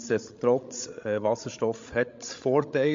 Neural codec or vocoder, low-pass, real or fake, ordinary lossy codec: codec, 16 kHz, 16 kbps, FreqCodec, larger model; 7.2 kHz; fake; MP3, 48 kbps